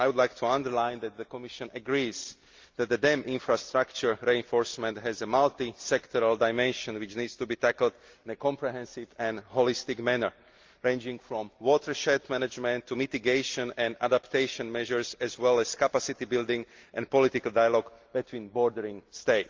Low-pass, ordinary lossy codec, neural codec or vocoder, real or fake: 7.2 kHz; Opus, 32 kbps; none; real